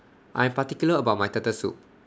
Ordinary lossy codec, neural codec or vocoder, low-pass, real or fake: none; none; none; real